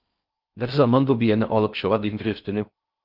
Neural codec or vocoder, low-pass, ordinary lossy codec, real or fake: codec, 16 kHz in and 24 kHz out, 0.6 kbps, FocalCodec, streaming, 4096 codes; 5.4 kHz; Opus, 24 kbps; fake